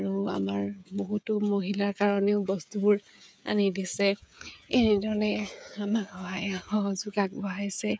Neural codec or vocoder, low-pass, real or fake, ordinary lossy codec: codec, 16 kHz, 16 kbps, FreqCodec, smaller model; none; fake; none